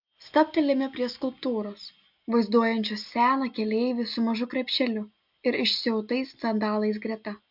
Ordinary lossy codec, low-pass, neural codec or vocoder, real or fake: MP3, 48 kbps; 5.4 kHz; none; real